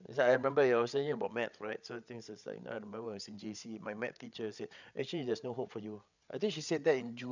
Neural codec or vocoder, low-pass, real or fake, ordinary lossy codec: codec, 16 kHz, 8 kbps, FreqCodec, larger model; 7.2 kHz; fake; none